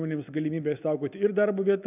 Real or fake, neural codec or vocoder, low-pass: real; none; 3.6 kHz